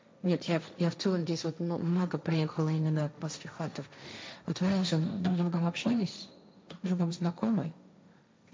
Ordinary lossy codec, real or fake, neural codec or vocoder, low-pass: none; fake; codec, 16 kHz, 1.1 kbps, Voila-Tokenizer; none